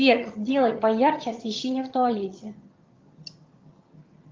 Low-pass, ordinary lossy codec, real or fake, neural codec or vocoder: 7.2 kHz; Opus, 32 kbps; fake; vocoder, 22.05 kHz, 80 mel bands, HiFi-GAN